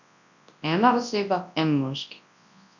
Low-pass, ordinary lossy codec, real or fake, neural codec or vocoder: 7.2 kHz; none; fake; codec, 24 kHz, 0.9 kbps, WavTokenizer, large speech release